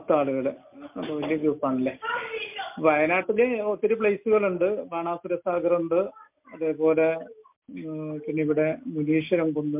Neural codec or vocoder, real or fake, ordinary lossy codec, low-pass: none; real; MP3, 32 kbps; 3.6 kHz